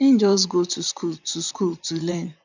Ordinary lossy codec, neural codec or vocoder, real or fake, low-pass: none; vocoder, 44.1 kHz, 128 mel bands, Pupu-Vocoder; fake; 7.2 kHz